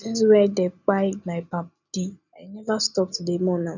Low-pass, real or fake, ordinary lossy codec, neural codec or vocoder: 7.2 kHz; real; none; none